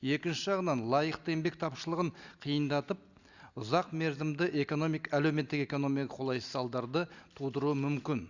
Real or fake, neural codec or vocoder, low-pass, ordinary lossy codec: real; none; 7.2 kHz; Opus, 64 kbps